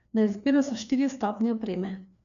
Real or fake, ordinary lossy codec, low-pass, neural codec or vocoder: fake; none; 7.2 kHz; codec, 16 kHz, 2 kbps, FreqCodec, larger model